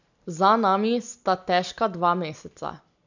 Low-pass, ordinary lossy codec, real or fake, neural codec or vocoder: 7.2 kHz; none; real; none